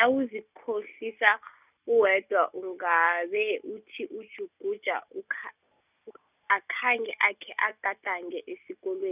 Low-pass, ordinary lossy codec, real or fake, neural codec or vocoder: 3.6 kHz; none; real; none